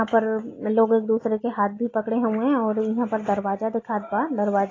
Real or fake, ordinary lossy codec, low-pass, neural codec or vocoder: real; AAC, 32 kbps; 7.2 kHz; none